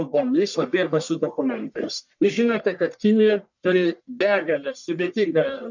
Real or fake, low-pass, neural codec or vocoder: fake; 7.2 kHz; codec, 44.1 kHz, 1.7 kbps, Pupu-Codec